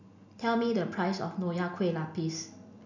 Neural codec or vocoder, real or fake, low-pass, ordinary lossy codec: none; real; 7.2 kHz; none